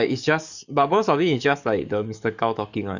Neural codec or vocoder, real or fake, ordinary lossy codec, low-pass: codec, 44.1 kHz, 7.8 kbps, DAC; fake; none; 7.2 kHz